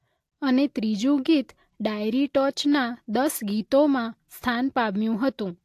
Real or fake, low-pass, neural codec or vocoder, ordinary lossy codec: real; 14.4 kHz; none; AAC, 64 kbps